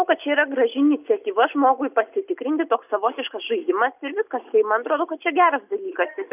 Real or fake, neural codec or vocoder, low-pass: real; none; 3.6 kHz